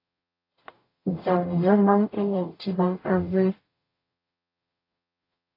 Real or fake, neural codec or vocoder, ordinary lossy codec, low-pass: fake; codec, 44.1 kHz, 0.9 kbps, DAC; AAC, 32 kbps; 5.4 kHz